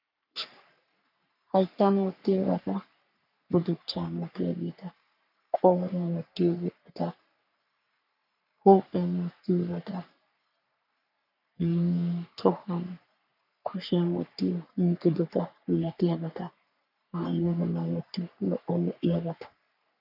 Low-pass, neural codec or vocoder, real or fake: 5.4 kHz; codec, 44.1 kHz, 3.4 kbps, Pupu-Codec; fake